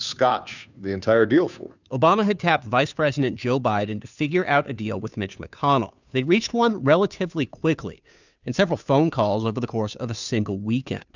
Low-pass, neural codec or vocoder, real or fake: 7.2 kHz; codec, 16 kHz, 2 kbps, FunCodec, trained on Chinese and English, 25 frames a second; fake